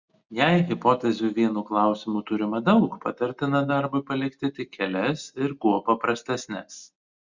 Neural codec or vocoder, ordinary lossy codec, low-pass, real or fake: none; Opus, 64 kbps; 7.2 kHz; real